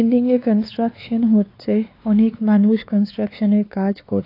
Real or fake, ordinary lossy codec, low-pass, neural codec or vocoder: fake; AAC, 32 kbps; 5.4 kHz; codec, 16 kHz, 2 kbps, X-Codec, WavLM features, trained on Multilingual LibriSpeech